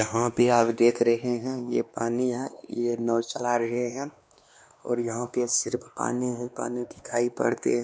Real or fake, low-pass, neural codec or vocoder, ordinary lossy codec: fake; none; codec, 16 kHz, 2 kbps, X-Codec, WavLM features, trained on Multilingual LibriSpeech; none